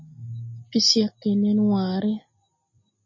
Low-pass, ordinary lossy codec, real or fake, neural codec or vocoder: 7.2 kHz; MP3, 32 kbps; real; none